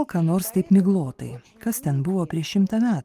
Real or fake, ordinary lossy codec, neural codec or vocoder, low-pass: real; Opus, 24 kbps; none; 14.4 kHz